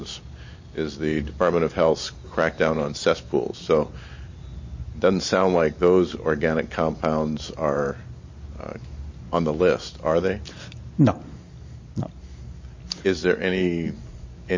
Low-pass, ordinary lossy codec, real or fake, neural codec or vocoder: 7.2 kHz; MP3, 32 kbps; real; none